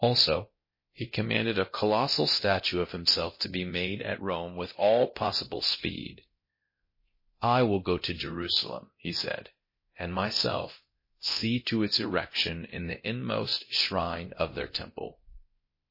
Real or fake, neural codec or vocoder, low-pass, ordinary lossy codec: fake; codec, 24 kHz, 0.9 kbps, DualCodec; 5.4 kHz; MP3, 24 kbps